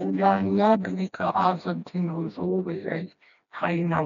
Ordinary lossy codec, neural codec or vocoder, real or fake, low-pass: none; codec, 16 kHz, 1 kbps, FreqCodec, smaller model; fake; 7.2 kHz